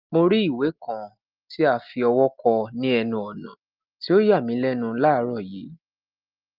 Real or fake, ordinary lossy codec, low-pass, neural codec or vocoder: real; Opus, 32 kbps; 5.4 kHz; none